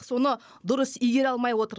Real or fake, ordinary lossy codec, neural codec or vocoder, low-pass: fake; none; codec, 16 kHz, 16 kbps, FunCodec, trained on Chinese and English, 50 frames a second; none